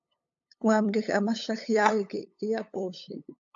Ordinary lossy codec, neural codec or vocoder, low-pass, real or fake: AAC, 64 kbps; codec, 16 kHz, 8 kbps, FunCodec, trained on LibriTTS, 25 frames a second; 7.2 kHz; fake